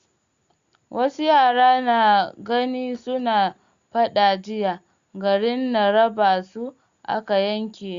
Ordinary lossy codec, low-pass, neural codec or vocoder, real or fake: Opus, 64 kbps; 7.2 kHz; none; real